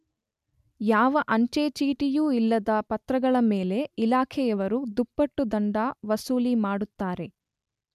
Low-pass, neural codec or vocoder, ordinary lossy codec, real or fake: 14.4 kHz; none; none; real